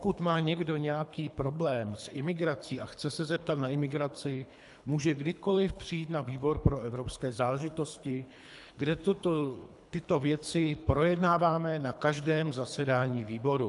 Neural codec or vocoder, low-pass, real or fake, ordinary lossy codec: codec, 24 kHz, 3 kbps, HILCodec; 10.8 kHz; fake; AAC, 96 kbps